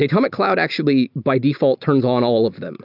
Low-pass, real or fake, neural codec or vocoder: 5.4 kHz; real; none